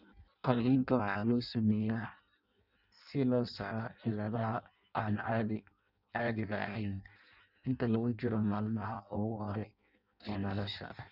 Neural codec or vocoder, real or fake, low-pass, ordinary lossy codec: codec, 16 kHz in and 24 kHz out, 0.6 kbps, FireRedTTS-2 codec; fake; 5.4 kHz; none